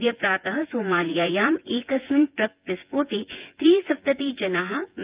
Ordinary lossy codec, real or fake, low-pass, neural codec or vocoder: Opus, 24 kbps; fake; 3.6 kHz; vocoder, 24 kHz, 100 mel bands, Vocos